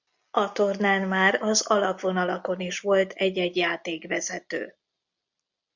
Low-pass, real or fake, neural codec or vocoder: 7.2 kHz; real; none